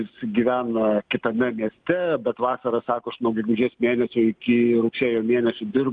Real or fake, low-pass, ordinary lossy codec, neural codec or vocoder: real; 9.9 kHz; Opus, 32 kbps; none